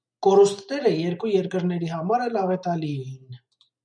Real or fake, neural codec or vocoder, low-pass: real; none; 9.9 kHz